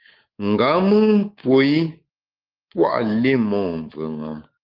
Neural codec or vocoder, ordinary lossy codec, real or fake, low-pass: codec, 24 kHz, 3.1 kbps, DualCodec; Opus, 32 kbps; fake; 5.4 kHz